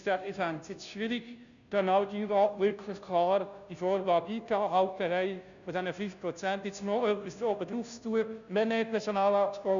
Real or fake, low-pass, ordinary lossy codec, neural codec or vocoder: fake; 7.2 kHz; MP3, 96 kbps; codec, 16 kHz, 0.5 kbps, FunCodec, trained on Chinese and English, 25 frames a second